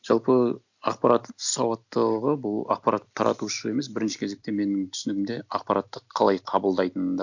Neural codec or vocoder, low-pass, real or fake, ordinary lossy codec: none; 7.2 kHz; real; none